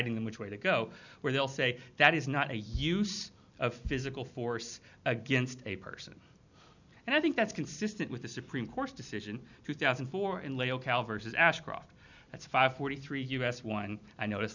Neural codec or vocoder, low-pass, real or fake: none; 7.2 kHz; real